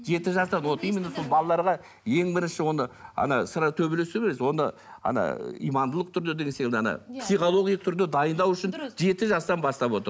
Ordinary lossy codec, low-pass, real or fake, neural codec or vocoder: none; none; real; none